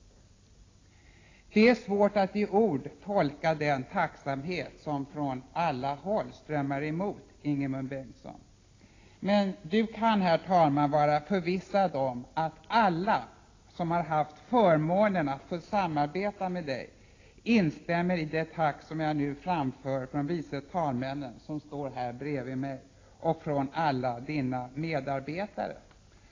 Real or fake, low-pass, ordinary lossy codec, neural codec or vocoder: real; 7.2 kHz; AAC, 32 kbps; none